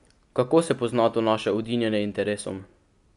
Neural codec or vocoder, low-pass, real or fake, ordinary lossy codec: none; 10.8 kHz; real; none